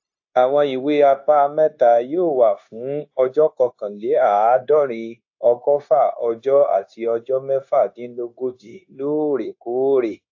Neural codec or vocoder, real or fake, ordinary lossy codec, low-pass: codec, 16 kHz, 0.9 kbps, LongCat-Audio-Codec; fake; AAC, 48 kbps; 7.2 kHz